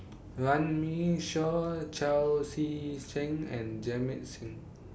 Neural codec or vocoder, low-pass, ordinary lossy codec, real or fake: none; none; none; real